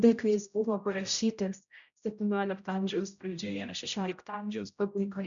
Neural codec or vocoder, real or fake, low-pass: codec, 16 kHz, 0.5 kbps, X-Codec, HuBERT features, trained on general audio; fake; 7.2 kHz